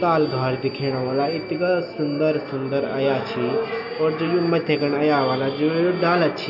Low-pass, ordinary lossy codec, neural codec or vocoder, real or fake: 5.4 kHz; none; none; real